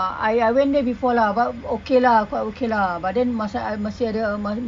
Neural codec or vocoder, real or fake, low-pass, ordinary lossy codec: none; real; 9.9 kHz; MP3, 96 kbps